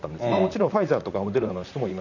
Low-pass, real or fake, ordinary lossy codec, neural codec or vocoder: 7.2 kHz; fake; none; vocoder, 44.1 kHz, 128 mel bands, Pupu-Vocoder